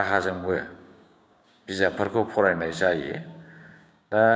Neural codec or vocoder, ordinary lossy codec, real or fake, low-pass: codec, 16 kHz, 6 kbps, DAC; none; fake; none